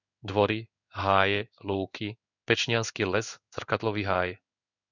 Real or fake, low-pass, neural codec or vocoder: fake; 7.2 kHz; codec, 16 kHz in and 24 kHz out, 1 kbps, XY-Tokenizer